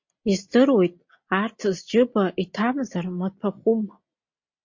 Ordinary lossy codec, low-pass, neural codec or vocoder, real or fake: MP3, 48 kbps; 7.2 kHz; none; real